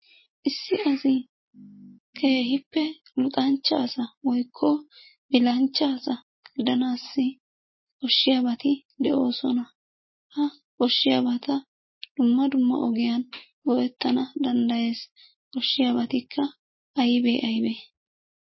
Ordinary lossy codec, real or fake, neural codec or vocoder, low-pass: MP3, 24 kbps; real; none; 7.2 kHz